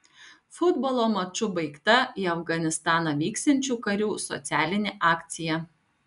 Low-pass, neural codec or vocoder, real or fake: 10.8 kHz; none; real